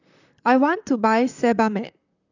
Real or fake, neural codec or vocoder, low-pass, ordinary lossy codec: fake; vocoder, 44.1 kHz, 128 mel bands, Pupu-Vocoder; 7.2 kHz; none